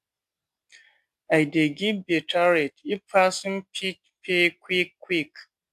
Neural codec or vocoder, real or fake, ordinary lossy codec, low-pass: none; real; AAC, 96 kbps; 14.4 kHz